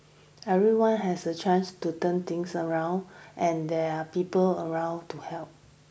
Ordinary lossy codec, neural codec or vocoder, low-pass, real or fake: none; none; none; real